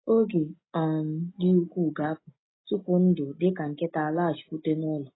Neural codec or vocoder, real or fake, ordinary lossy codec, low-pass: none; real; AAC, 16 kbps; 7.2 kHz